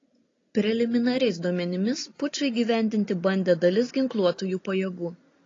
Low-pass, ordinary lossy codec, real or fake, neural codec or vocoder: 7.2 kHz; AAC, 32 kbps; real; none